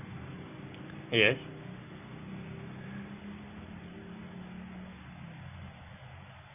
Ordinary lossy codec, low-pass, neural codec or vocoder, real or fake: none; 3.6 kHz; none; real